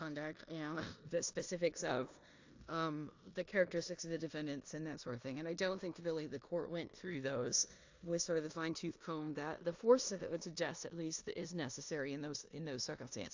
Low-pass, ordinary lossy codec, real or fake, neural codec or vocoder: 7.2 kHz; AAC, 48 kbps; fake; codec, 16 kHz in and 24 kHz out, 0.9 kbps, LongCat-Audio-Codec, four codebook decoder